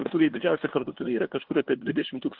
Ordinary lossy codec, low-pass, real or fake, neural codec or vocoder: Opus, 16 kbps; 5.4 kHz; fake; codec, 16 kHz, 2 kbps, FunCodec, trained on LibriTTS, 25 frames a second